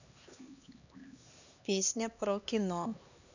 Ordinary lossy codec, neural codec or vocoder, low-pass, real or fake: none; codec, 16 kHz, 2 kbps, X-Codec, HuBERT features, trained on LibriSpeech; 7.2 kHz; fake